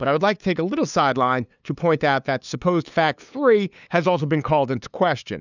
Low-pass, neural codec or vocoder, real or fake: 7.2 kHz; codec, 16 kHz, 2 kbps, FunCodec, trained on LibriTTS, 25 frames a second; fake